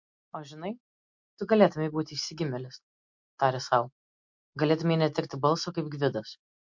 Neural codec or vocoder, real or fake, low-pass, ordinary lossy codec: none; real; 7.2 kHz; MP3, 48 kbps